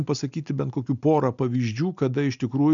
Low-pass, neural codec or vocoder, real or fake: 7.2 kHz; none; real